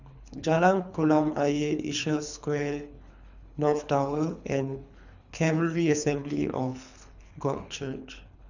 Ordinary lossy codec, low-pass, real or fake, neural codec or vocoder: none; 7.2 kHz; fake; codec, 24 kHz, 3 kbps, HILCodec